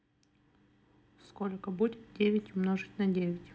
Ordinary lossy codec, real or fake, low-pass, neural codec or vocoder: none; real; none; none